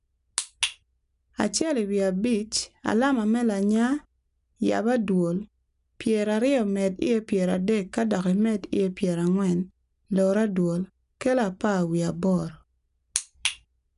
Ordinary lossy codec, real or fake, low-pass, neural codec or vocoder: none; real; 10.8 kHz; none